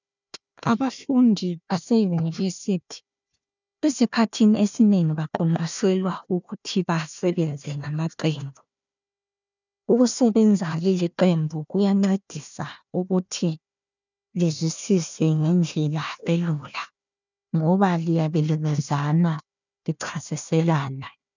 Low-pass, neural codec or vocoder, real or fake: 7.2 kHz; codec, 16 kHz, 1 kbps, FunCodec, trained on Chinese and English, 50 frames a second; fake